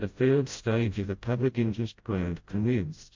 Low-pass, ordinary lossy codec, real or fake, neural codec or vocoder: 7.2 kHz; MP3, 48 kbps; fake; codec, 16 kHz, 0.5 kbps, FreqCodec, smaller model